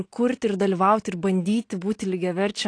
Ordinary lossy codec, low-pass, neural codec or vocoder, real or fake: AAC, 48 kbps; 9.9 kHz; none; real